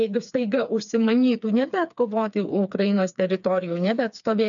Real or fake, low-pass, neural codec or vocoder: fake; 7.2 kHz; codec, 16 kHz, 4 kbps, FreqCodec, smaller model